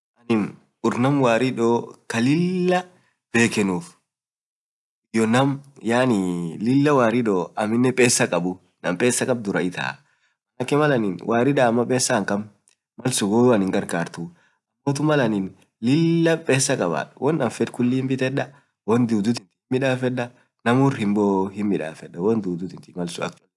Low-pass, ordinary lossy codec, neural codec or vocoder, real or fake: none; none; none; real